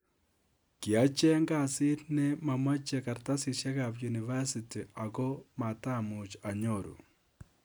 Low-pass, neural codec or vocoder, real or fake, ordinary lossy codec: none; none; real; none